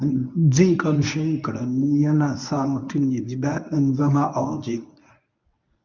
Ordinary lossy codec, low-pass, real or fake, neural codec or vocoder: none; 7.2 kHz; fake; codec, 24 kHz, 0.9 kbps, WavTokenizer, medium speech release version 1